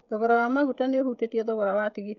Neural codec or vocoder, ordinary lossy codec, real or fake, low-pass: codec, 16 kHz, 16 kbps, FreqCodec, smaller model; none; fake; 7.2 kHz